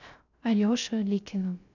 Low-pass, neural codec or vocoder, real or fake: 7.2 kHz; codec, 16 kHz, 0.3 kbps, FocalCodec; fake